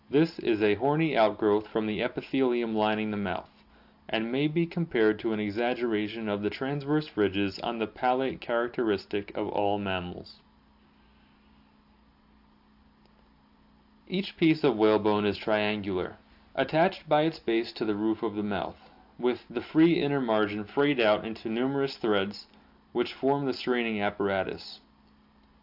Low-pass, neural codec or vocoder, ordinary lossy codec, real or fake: 5.4 kHz; none; AAC, 48 kbps; real